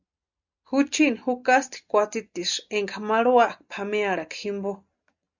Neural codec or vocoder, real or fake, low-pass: none; real; 7.2 kHz